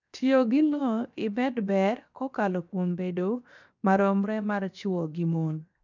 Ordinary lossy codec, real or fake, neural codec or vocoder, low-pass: none; fake; codec, 16 kHz, 0.7 kbps, FocalCodec; 7.2 kHz